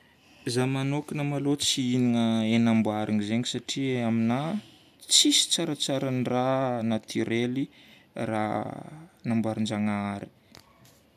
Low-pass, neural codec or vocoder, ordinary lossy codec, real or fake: 14.4 kHz; none; none; real